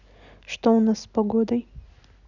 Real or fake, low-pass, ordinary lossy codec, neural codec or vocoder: real; 7.2 kHz; none; none